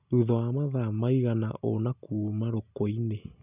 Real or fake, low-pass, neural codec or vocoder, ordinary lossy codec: real; 3.6 kHz; none; none